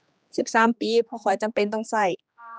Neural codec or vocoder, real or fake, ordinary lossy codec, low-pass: codec, 16 kHz, 2 kbps, X-Codec, HuBERT features, trained on general audio; fake; none; none